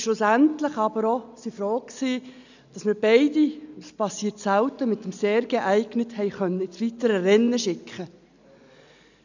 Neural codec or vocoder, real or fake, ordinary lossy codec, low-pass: none; real; none; 7.2 kHz